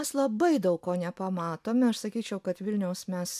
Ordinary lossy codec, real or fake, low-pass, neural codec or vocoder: AAC, 96 kbps; real; 14.4 kHz; none